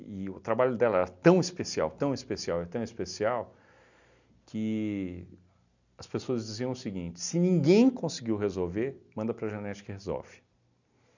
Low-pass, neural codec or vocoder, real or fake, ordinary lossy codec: 7.2 kHz; none; real; none